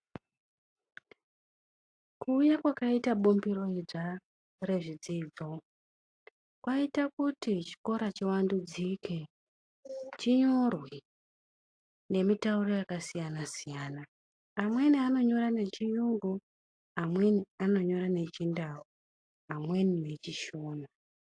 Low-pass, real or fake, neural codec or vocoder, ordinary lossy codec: 9.9 kHz; real; none; AAC, 64 kbps